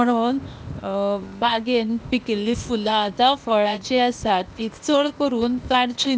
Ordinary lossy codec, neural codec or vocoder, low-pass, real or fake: none; codec, 16 kHz, 0.8 kbps, ZipCodec; none; fake